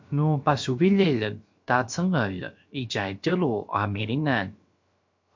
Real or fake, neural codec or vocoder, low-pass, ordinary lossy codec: fake; codec, 16 kHz, about 1 kbps, DyCAST, with the encoder's durations; 7.2 kHz; MP3, 48 kbps